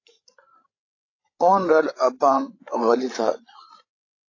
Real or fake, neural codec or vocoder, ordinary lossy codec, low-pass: fake; codec, 16 kHz, 16 kbps, FreqCodec, larger model; AAC, 32 kbps; 7.2 kHz